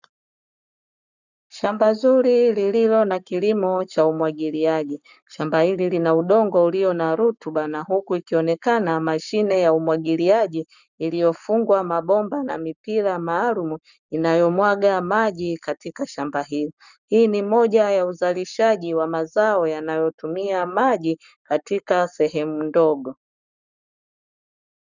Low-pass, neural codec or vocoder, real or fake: 7.2 kHz; codec, 44.1 kHz, 7.8 kbps, Pupu-Codec; fake